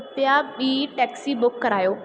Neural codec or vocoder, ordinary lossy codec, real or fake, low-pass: none; none; real; none